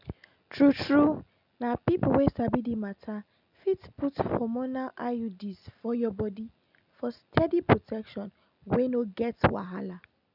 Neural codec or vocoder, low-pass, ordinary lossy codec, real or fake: none; 5.4 kHz; none; real